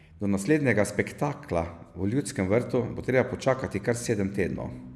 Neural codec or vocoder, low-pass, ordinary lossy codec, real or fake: none; none; none; real